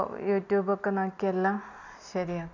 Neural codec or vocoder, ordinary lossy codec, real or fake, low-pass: none; none; real; 7.2 kHz